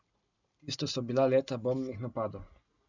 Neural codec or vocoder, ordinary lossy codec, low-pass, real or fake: none; none; 7.2 kHz; real